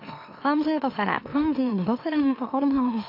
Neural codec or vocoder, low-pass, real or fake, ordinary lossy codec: autoencoder, 44.1 kHz, a latent of 192 numbers a frame, MeloTTS; 5.4 kHz; fake; AAC, 32 kbps